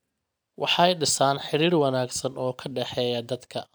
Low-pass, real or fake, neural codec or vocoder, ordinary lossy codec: none; real; none; none